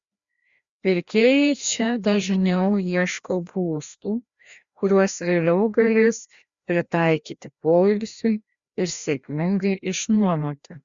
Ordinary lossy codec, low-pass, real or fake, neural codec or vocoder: Opus, 64 kbps; 7.2 kHz; fake; codec, 16 kHz, 1 kbps, FreqCodec, larger model